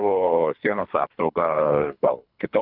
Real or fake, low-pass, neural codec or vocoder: fake; 5.4 kHz; codec, 24 kHz, 3 kbps, HILCodec